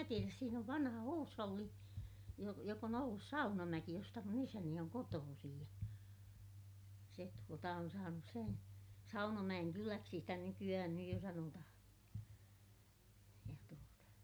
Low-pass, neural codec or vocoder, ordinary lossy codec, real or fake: none; none; none; real